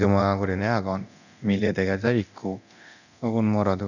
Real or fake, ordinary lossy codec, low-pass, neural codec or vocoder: fake; none; 7.2 kHz; codec, 24 kHz, 0.9 kbps, DualCodec